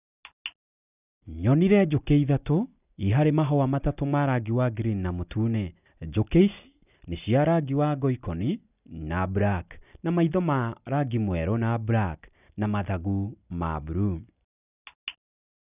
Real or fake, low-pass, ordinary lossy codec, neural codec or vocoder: real; 3.6 kHz; none; none